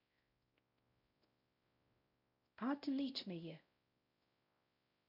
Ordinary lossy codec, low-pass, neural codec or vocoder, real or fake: none; 5.4 kHz; codec, 16 kHz, 0.5 kbps, X-Codec, WavLM features, trained on Multilingual LibriSpeech; fake